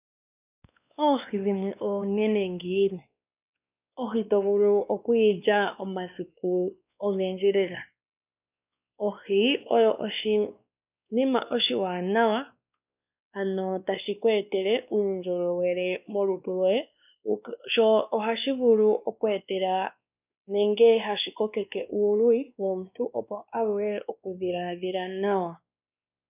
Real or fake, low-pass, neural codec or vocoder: fake; 3.6 kHz; codec, 16 kHz, 2 kbps, X-Codec, WavLM features, trained on Multilingual LibriSpeech